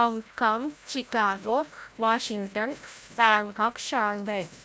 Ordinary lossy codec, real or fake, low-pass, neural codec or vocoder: none; fake; none; codec, 16 kHz, 0.5 kbps, FreqCodec, larger model